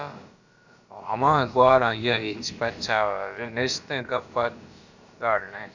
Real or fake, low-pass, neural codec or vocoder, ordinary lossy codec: fake; 7.2 kHz; codec, 16 kHz, about 1 kbps, DyCAST, with the encoder's durations; Opus, 64 kbps